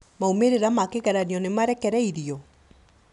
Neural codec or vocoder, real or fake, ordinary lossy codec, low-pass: none; real; MP3, 96 kbps; 10.8 kHz